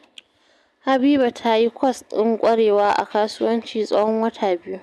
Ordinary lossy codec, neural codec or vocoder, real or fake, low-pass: none; none; real; none